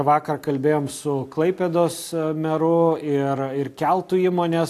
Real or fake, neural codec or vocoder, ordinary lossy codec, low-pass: real; none; AAC, 64 kbps; 14.4 kHz